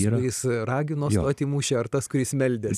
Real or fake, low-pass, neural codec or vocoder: real; 14.4 kHz; none